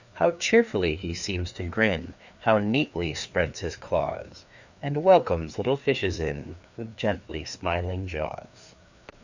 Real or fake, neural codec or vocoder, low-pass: fake; codec, 16 kHz, 2 kbps, FreqCodec, larger model; 7.2 kHz